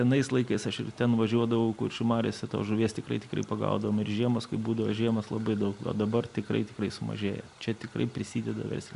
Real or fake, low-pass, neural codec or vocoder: real; 10.8 kHz; none